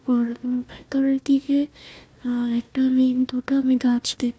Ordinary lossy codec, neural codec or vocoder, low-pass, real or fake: none; codec, 16 kHz, 1 kbps, FunCodec, trained on Chinese and English, 50 frames a second; none; fake